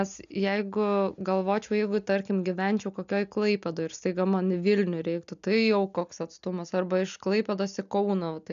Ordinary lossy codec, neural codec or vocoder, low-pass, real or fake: MP3, 96 kbps; none; 7.2 kHz; real